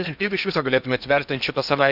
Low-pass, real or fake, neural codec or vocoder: 5.4 kHz; fake; codec, 16 kHz in and 24 kHz out, 0.8 kbps, FocalCodec, streaming, 65536 codes